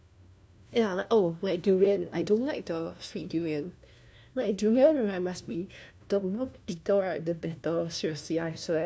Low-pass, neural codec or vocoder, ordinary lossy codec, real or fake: none; codec, 16 kHz, 1 kbps, FunCodec, trained on LibriTTS, 50 frames a second; none; fake